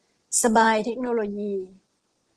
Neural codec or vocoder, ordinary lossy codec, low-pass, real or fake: vocoder, 24 kHz, 100 mel bands, Vocos; Opus, 16 kbps; 10.8 kHz; fake